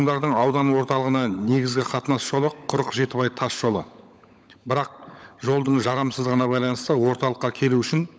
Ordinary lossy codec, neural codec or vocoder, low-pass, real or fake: none; codec, 16 kHz, 16 kbps, FunCodec, trained on LibriTTS, 50 frames a second; none; fake